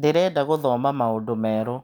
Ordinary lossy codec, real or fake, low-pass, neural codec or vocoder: none; real; none; none